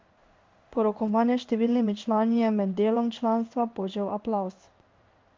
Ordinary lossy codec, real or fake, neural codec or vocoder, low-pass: Opus, 32 kbps; fake; codec, 16 kHz in and 24 kHz out, 1 kbps, XY-Tokenizer; 7.2 kHz